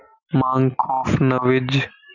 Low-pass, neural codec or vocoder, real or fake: 7.2 kHz; none; real